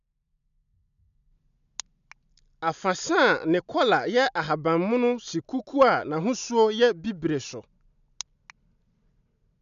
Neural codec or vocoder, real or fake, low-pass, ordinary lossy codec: none; real; 7.2 kHz; none